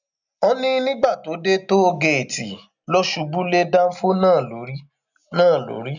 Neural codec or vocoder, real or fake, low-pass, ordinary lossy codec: none; real; 7.2 kHz; none